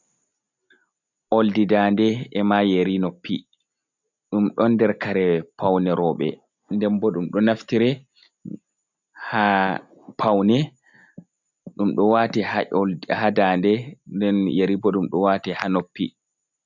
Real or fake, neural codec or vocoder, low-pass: real; none; 7.2 kHz